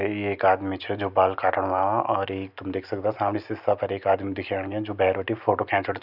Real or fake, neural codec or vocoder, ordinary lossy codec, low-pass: real; none; none; 5.4 kHz